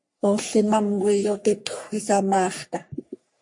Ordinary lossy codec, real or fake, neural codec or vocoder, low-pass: MP3, 48 kbps; fake; codec, 44.1 kHz, 3.4 kbps, Pupu-Codec; 10.8 kHz